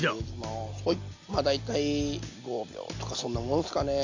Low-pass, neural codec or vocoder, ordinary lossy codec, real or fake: 7.2 kHz; none; AAC, 48 kbps; real